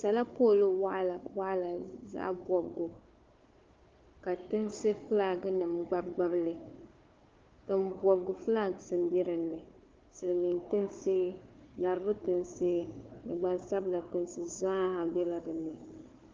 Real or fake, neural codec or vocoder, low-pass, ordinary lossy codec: fake; codec, 16 kHz, 4 kbps, FunCodec, trained on Chinese and English, 50 frames a second; 7.2 kHz; Opus, 32 kbps